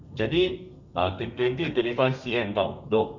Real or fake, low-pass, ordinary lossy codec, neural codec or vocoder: fake; 7.2 kHz; none; codec, 32 kHz, 1.9 kbps, SNAC